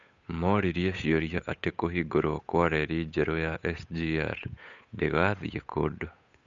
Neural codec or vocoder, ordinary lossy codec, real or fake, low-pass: codec, 16 kHz, 8 kbps, FunCodec, trained on Chinese and English, 25 frames a second; none; fake; 7.2 kHz